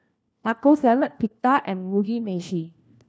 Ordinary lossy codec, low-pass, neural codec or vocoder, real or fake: none; none; codec, 16 kHz, 1 kbps, FunCodec, trained on LibriTTS, 50 frames a second; fake